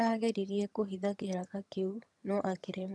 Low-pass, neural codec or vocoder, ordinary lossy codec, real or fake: none; vocoder, 22.05 kHz, 80 mel bands, HiFi-GAN; none; fake